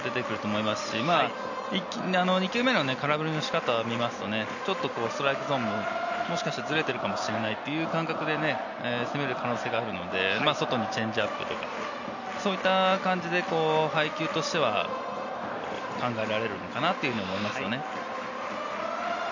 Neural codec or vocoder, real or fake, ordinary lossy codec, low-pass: none; real; none; 7.2 kHz